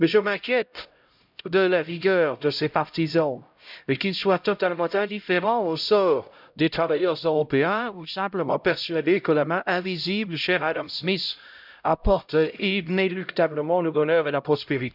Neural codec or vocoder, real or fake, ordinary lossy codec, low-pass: codec, 16 kHz, 0.5 kbps, X-Codec, HuBERT features, trained on LibriSpeech; fake; none; 5.4 kHz